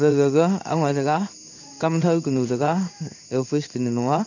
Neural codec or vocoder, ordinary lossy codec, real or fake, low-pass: codec, 16 kHz in and 24 kHz out, 1 kbps, XY-Tokenizer; none; fake; 7.2 kHz